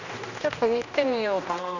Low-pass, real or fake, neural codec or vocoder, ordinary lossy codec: 7.2 kHz; fake; codec, 16 kHz, 1 kbps, X-Codec, HuBERT features, trained on general audio; none